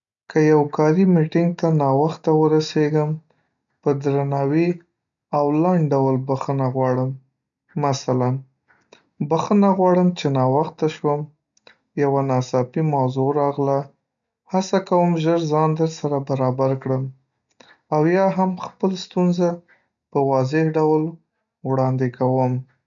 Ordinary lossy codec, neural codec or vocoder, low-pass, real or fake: none; none; 7.2 kHz; real